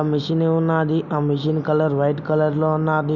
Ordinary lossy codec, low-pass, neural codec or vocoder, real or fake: none; 7.2 kHz; none; real